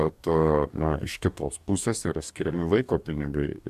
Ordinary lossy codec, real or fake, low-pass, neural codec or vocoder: AAC, 96 kbps; fake; 14.4 kHz; codec, 44.1 kHz, 2.6 kbps, DAC